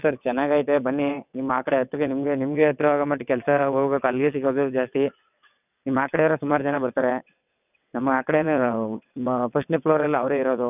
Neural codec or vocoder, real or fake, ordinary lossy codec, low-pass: vocoder, 22.05 kHz, 80 mel bands, WaveNeXt; fake; none; 3.6 kHz